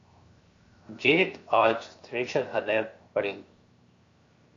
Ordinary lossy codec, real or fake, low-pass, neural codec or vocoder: AAC, 48 kbps; fake; 7.2 kHz; codec, 16 kHz, 0.7 kbps, FocalCodec